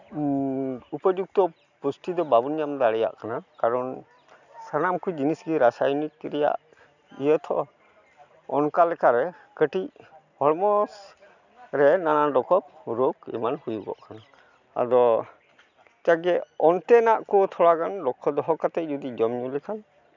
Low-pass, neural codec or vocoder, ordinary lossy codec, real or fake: 7.2 kHz; none; none; real